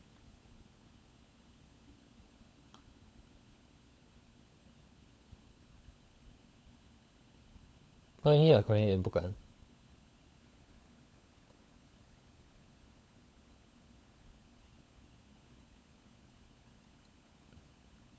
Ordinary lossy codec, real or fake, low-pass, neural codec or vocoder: none; fake; none; codec, 16 kHz, 16 kbps, FunCodec, trained on LibriTTS, 50 frames a second